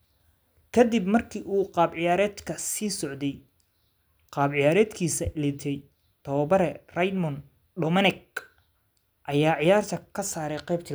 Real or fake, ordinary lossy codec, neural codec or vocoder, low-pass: real; none; none; none